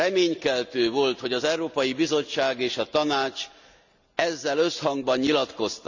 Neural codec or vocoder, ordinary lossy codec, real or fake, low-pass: none; none; real; 7.2 kHz